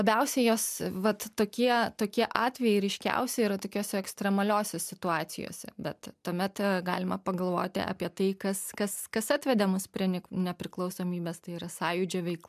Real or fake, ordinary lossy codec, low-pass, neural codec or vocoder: real; MP3, 96 kbps; 14.4 kHz; none